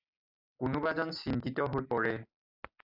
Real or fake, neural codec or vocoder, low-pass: real; none; 5.4 kHz